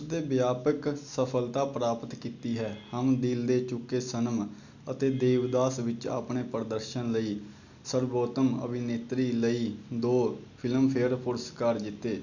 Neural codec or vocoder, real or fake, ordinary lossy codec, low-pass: none; real; none; 7.2 kHz